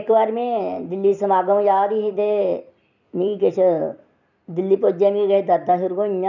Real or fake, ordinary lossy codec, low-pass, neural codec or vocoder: fake; none; 7.2 kHz; vocoder, 44.1 kHz, 128 mel bands every 256 samples, BigVGAN v2